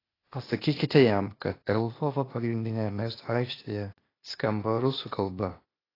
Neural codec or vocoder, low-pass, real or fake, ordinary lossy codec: codec, 16 kHz, 0.8 kbps, ZipCodec; 5.4 kHz; fake; AAC, 24 kbps